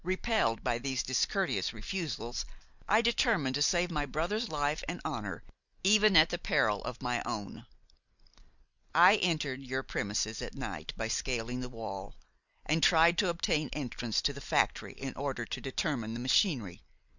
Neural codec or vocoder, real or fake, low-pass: none; real; 7.2 kHz